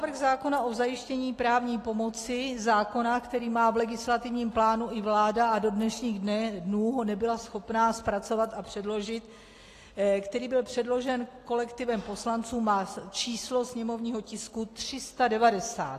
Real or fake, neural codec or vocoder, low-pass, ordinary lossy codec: real; none; 14.4 kHz; AAC, 48 kbps